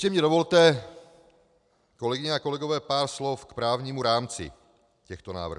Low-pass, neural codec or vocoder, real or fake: 10.8 kHz; none; real